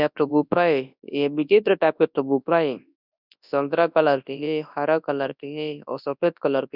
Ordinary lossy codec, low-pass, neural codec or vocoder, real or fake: none; 5.4 kHz; codec, 24 kHz, 0.9 kbps, WavTokenizer, large speech release; fake